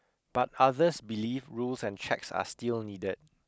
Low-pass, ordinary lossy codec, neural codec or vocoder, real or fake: none; none; none; real